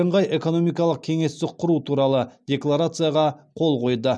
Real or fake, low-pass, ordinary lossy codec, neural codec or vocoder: real; none; none; none